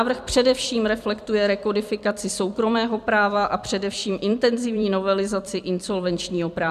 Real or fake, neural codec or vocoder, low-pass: fake; vocoder, 44.1 kHz, 128 mel bands every 256 samples, BigVGAN v2; 14.4 kHz